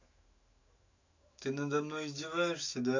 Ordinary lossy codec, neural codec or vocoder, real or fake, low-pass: AAC, 48 kbps; autoencoder, 48 kHz, 128 numbers a frame, DAC-VAE, trained on Japanese speech; fake; 7.2 kHz